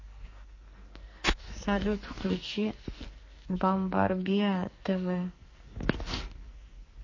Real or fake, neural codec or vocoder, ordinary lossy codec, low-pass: fake; codec, 44.1 kHz, 2.6 kbps, SNAC; MP3, 32 kbps; 7.2 kHz